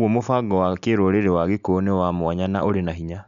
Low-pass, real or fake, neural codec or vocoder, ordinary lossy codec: 7.2 kHz; real; none; none